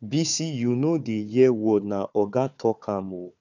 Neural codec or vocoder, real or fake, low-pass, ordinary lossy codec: codec, 16 kHz, 4 kbps, FunCodec, trained on Chinese and English, 50 frames a second; fake; 7.2 kHz; none